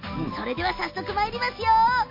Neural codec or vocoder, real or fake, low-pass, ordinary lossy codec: none; real; 5.4 kHz; MP3, 32 kbps